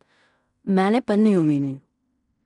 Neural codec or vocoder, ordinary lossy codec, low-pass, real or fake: codec, 16 kHz in and 24 kHz out, 0.4 kbps, LongCat-Audio-Codec, fine tuned four codebook decoder; none; 10.8 kHz; fake